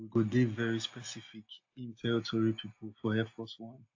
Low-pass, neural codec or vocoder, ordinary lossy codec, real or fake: 7.2 kHz; none; AAC, 48 kbps; real